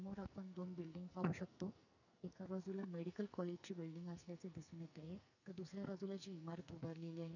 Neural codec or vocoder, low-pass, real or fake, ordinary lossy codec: codec, 44.1 kHz, 2.6 kbps, SNAC; 7.2 kHz; fake; none